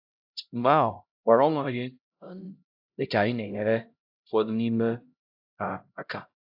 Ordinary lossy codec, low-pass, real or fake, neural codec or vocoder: AAC, 48 kbps; 5.4 kHz; fake; codec, 16 kHz, 0.5 kbps, X-Codec, HuBERT features, trained on LibriSpeech